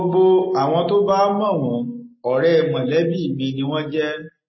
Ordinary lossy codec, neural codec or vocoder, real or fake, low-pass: MP3, 24 kbps; none; real; 7.2 kHz